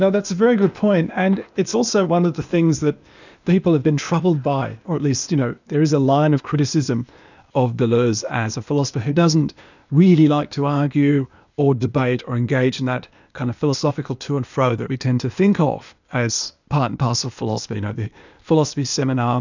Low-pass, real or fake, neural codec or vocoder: 7.2 kHz; fake; codec, 16 kHz, 0.8 kbps, ZipCodec